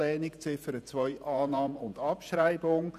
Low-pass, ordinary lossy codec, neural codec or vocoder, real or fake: 14.4 kHz; none; vocoder, 48 kHz, 128 mel bands, Vocos; fake